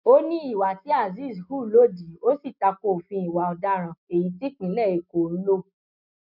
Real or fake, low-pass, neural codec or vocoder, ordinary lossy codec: real; 5.4 kHz; none; none